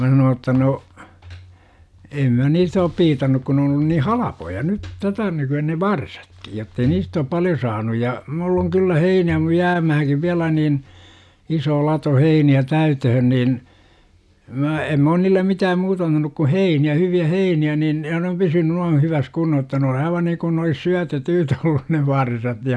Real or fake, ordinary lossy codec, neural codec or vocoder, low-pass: real; none; none; none